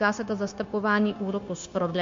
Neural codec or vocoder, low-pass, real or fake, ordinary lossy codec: codec, 16 kHz, 0.9 kbps, LongCat-Audio-Codec; 7.2 kHz; fake; MP3, 48 kbps